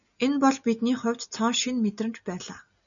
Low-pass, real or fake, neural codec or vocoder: 7.2 kHz; real; none